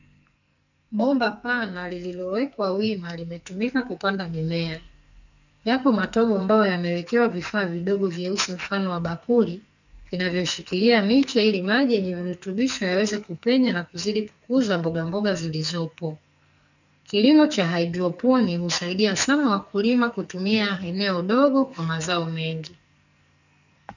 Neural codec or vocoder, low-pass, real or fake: codec, 44.1 kHz, 2.6 kbps, SNAC; 7.2 kHz; fake